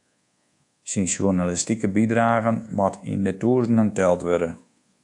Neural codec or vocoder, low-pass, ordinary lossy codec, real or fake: codec, 24 kHz, 1.2 kbps, DualCodec; 10.8 kHz; AAC, 64 kbps; fake